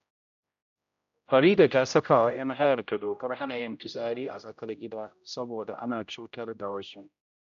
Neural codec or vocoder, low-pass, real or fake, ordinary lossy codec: codec, 16 kHz, 0.5 kbps, X-Codec, HuBERT features, trained on general audio; 7.2 kHz; fake; none